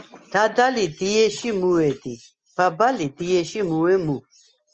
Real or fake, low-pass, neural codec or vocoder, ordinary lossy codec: real; 7.2 kHz; none; Opus, 24 kbps